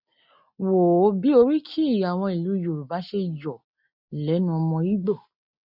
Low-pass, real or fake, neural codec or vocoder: 5.4 kHz; real; none